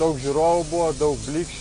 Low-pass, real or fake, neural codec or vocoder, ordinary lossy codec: 9.9 kHz; real; none; AAC, 64 kbps